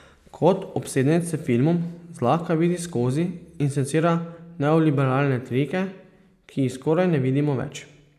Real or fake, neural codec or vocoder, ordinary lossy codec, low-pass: real; none; none; 14.4 kHz